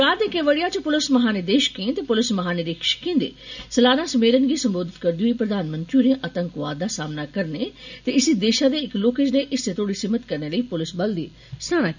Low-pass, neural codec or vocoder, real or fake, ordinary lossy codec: 7.2 kHz; none; real; none